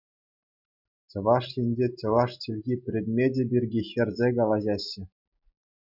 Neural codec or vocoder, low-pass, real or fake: none; 5.4 kHz; real